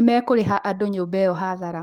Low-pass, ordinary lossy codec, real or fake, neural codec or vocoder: 19.8 kHz; Opus, 24 kbps; fake; codec, 44.1 kHz, 7.8 kbps, DAC